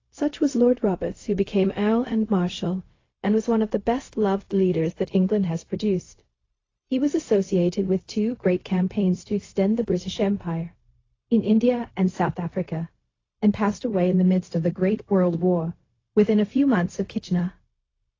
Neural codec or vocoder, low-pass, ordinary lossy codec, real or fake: codec, 16 kHz, 0.4 kbps, LongCat-Audio-Codec; 7.2 kHz; AAC, 32 kbps; fake